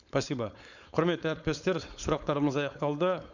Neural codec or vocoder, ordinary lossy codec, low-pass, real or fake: codec, 16 kHz, 4.8 kbps, FACodec; none; 7.2 kHz; fake